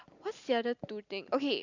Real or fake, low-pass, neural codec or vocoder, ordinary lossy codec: real; 7.2 kHz; none; none